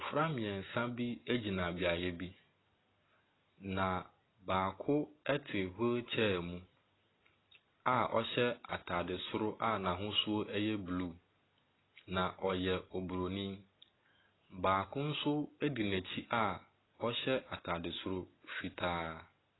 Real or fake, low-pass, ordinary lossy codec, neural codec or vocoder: real; 7.2 kHz; AAC, 16 kbps; none